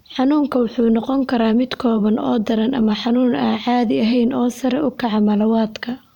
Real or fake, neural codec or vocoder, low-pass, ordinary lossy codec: fake; vocoder, 44.1 kHz, 128 mel bands every 512 samples, BigVGAN v2; 19.8 kHz; none